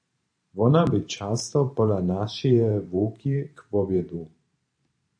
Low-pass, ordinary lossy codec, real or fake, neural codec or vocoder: 9.9 kHz; AAC, 64 kbps; fake; vocoder, 44.1 kHz, 128 mel bands every 512 samples, BigVGAN v2